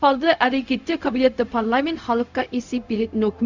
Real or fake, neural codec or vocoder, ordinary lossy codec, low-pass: fake; codec, 16 kHz, 0.4 kbps, LongCat-Audio-Codec; Opus, 64 kbps; 7.2 kHz